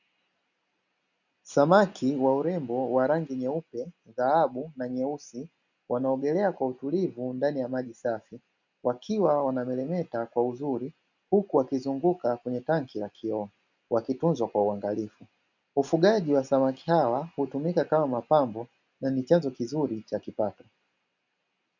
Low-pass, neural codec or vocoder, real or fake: 7.2 kHz; none; real